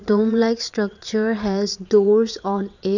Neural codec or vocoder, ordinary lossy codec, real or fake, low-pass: vocoder, 22.05 kHz, 80 mel bands, WaveNeXt; none; fake; 7.2 kHz